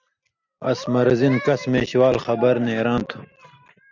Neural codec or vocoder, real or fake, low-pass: none; real; 7.2 kHz